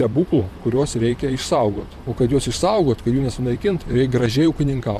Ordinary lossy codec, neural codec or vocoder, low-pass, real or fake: MP3, 96 kbps; vocoder, 44.1 kHz, 128 mel bands, Pupu-Vocoder; 14.4 kHz; fake